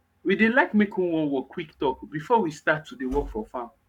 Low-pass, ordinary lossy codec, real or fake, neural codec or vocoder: 19.8 kHz; none; fake; codec, 44.1 kHz, 7.8 kbps, Pupu-Codec